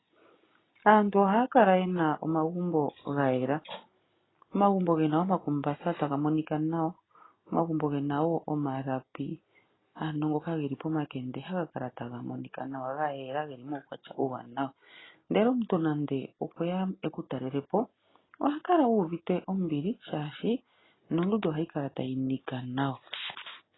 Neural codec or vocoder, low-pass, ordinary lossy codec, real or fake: none; 7.2 kHz; AAC, 16 kbps; real